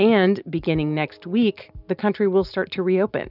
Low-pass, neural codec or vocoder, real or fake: 5.4 kHz; none; real